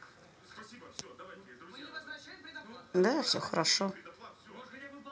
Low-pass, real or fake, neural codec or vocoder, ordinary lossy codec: none; real; none; none